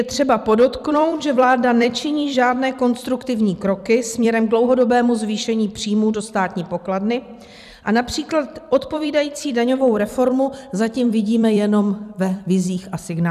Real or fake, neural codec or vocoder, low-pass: fake; vocoder, 44.1 kHz, 128 mel bands every 256 samples, BigVGAN v2; 14.4 kHz